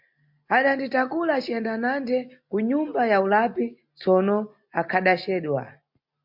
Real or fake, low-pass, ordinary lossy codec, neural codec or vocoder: real; 5.4 kHz; MP3, 48 kbps; none